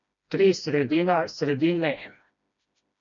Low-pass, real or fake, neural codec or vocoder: 7.2 kHz; fake; codec, 16 kHz, 1 kbps, FreqCodec, smaller model